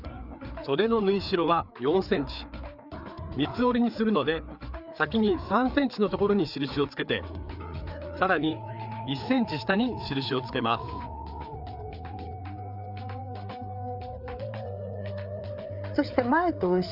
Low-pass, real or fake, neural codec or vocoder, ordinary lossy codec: 5.4 kHz; fake; codec, 16 kHz, 4 kbps, FreqCodec, larger model; none